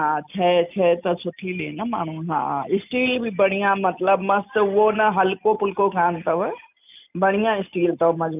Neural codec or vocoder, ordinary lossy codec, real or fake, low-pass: none; none; real; 3.6 kHz